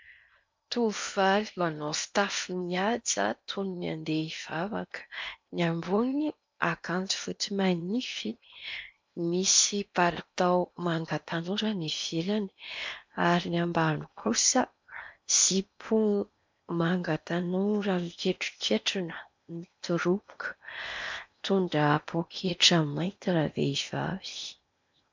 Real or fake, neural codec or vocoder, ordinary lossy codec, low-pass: fake; codec, 16 kHz in and 24 kHz out, 0.8 kbps, FocalCodec, streaming, 65536 codes; MP3, 64 kbps; 7.2 kHz